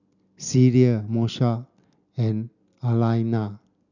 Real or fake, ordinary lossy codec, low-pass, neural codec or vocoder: real; none; 7.2 kHz; none